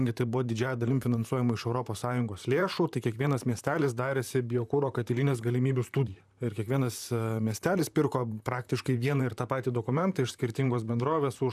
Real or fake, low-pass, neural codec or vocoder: fake; 14.4 kHz; vocoder, 44.1 kHz, 128 mel bands, Pupu-Vocoder